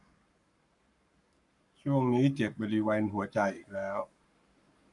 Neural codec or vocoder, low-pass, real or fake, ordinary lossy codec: codec, 44.1 kHz, 7.8 kbps, Pupu-Codec; 10.8 kHz; fake; none